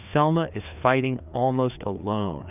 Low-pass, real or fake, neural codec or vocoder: 3.6 kHz; fake; codec, 16 kHz, 2 kbps, FreqCodec, larger model